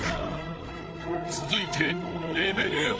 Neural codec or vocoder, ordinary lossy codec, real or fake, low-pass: codec, 16 kHz, 8 kbps, FreqCodec, larger model; none; fake; none